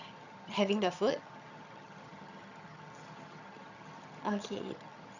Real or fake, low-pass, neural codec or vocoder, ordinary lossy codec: fake; 7.2 kHz; vocoder, 22.05 kHz, 80 mel bands, HiFi-GAN; none